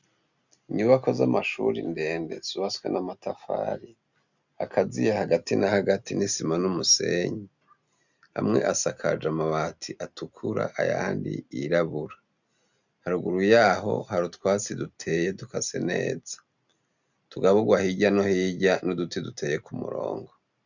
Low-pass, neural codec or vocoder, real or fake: 7.2 kHz; none; real